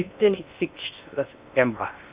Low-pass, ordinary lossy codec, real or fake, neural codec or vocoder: 3.6 kHz; Opus, 64 kbps; fake; codec, 16 kHz in and 24 kHz out, 0.6 kbps, FocalCodec, streaming, 2048 codes